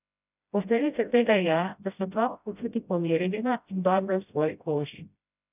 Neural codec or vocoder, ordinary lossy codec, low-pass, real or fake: codec, 16 kHz, 0.5 kbps, FreqCodec, smaller model; none; 3.6 kHz; fake